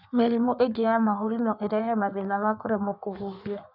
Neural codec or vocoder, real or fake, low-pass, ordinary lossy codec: codec, 16 kHz in and 24 kHz out, 1.1 kbps, FireRedTTS-2 codec; fake; 5.4 kHz; none